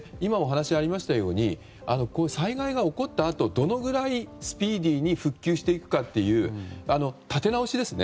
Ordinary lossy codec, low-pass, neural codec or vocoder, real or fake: none; none; none; real